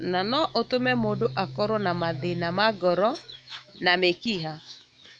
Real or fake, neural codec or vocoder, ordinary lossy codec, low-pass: fake; autoencoder, 48 kHz, 128 numbers a frame, DAC-VAE, trained on Japanese speech; none; 9.9 kHz